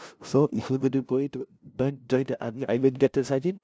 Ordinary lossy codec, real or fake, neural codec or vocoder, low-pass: none; fake; codec, 16 kHz, 0.5 kbps, FunCodec, trained on LibriTTS, 25 frames a second; none